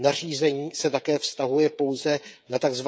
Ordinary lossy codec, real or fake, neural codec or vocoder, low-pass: none; fake; codec, 16 kHz, 16 kbps, FreqCodec, smaller model; none